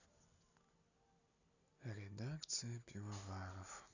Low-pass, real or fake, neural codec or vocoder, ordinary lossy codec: 7.2 kHz; real; none; none